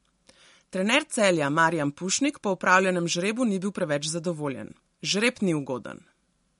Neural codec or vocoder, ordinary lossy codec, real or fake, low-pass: none; MP3, 48 kbps; real; 19.8 kHz